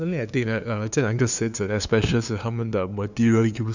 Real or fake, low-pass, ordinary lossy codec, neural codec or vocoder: fake; 7.2 kHz; none; codec, 16 kHz, 2 kbps, FunCodec, trained on LibriTTS, 25 frames a second